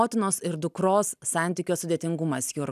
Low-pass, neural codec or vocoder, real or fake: 14.4 kHz; none; real